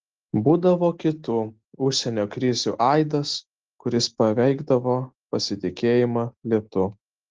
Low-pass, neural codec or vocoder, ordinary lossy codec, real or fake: 7.2 kHz; none; Opus, 16 kbps; real